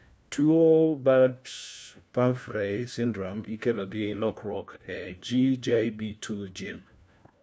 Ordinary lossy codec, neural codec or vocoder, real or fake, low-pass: none; codec, 16 kHz, 1 kbps, FunCodec, trained on LibriTTS, 50 frames a second; fake; none